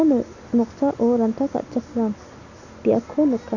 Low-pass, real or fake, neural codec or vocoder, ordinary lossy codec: 7.2 kHz; real; none; none